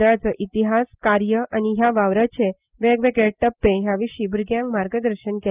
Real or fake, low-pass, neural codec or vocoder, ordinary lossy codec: real; 3.6 kHz; none; Opus, 24 kbps